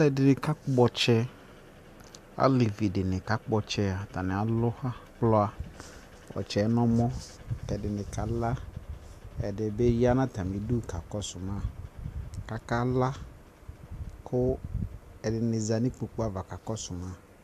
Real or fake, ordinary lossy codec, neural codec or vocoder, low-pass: real; AAC, 96 kbps; none; 14.4 kHz